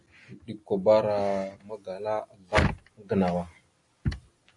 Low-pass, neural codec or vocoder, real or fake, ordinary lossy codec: 10.8 kHz; none; real; AAC, 48 kbps